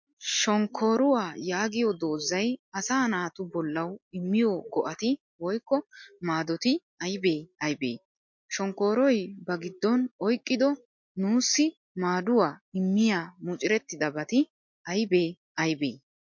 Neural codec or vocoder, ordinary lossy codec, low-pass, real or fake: none; MP3, 48 kbps; 7.2 kHz; real